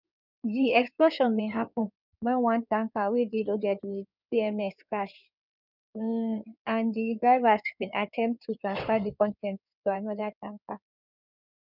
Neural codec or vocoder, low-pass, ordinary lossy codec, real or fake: codec, 16 kHz in and 24 kHz out, 2.2 kbps, FireRedTTS-2 codec; 5.4 kHz; none; fake